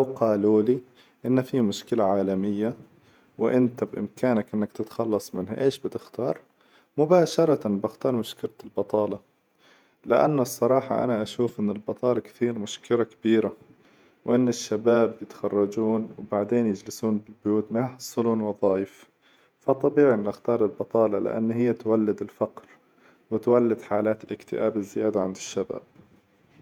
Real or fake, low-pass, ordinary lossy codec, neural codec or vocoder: fake; 19.8 kHz; MP3, 96 kbps; vocoder, 48 kHz, 128 mel bands, Vocos